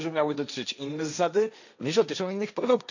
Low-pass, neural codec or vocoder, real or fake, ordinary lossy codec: 7.2 kHz; codec, 16 kHz, 1.1 kbps, Voila-Tokenizer; fake; none